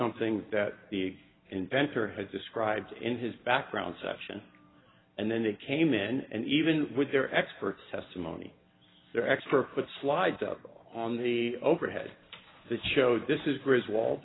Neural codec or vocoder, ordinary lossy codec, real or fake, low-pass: none; AAC, 16 kbps; real; 7.2 kHz